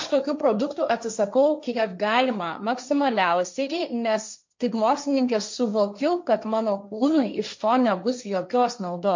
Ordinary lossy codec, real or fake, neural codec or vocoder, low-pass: MP3, 48 kbps; fake; codec, 16 kHz, 1.1 kbps, Voila-Tokenizer; 7.2 kHz